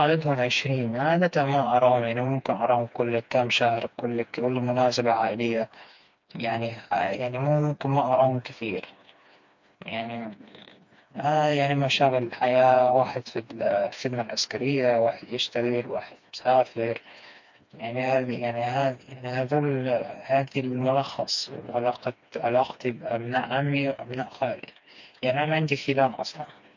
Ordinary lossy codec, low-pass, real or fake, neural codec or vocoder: MP3, 48 kbps; 7.2 kHz; fake; codec, 16 kHz, 2 kbps, FreqCodec, smaller model